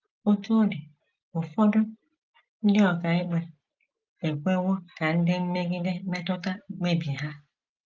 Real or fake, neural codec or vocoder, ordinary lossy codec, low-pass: real; none; Opus, 24 kbps; 7.2 kHz